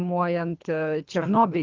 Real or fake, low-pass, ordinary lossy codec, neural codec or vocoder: fake; 7.2 kHz; Opus, 32 kbps; codec, 24 kHz, 3 kbps, HILCodec